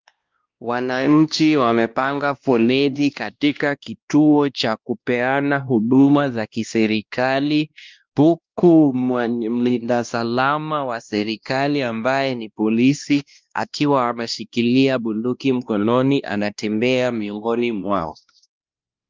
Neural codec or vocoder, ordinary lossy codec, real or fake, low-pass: codec, 16 kHz, 1 kbps, X-Codec, WavLM features, trained on Multilingual LibriSpeech; Opus, 32 kbps; fake; 7.2 kHz